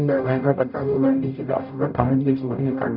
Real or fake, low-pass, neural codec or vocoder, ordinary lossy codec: fake; 5.4 kHz; codec, 44.1 kHz, 0.9 kbps, DAC; none